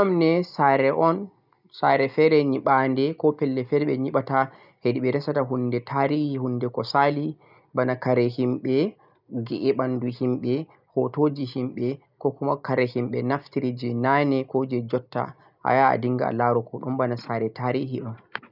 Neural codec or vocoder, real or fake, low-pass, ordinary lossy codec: none; real; 5.4 kHz; none